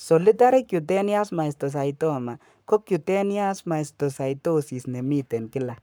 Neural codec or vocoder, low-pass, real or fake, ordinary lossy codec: codec, 44.1 kHz, 7.8 kbps, Pupu-Codec; none; fake; none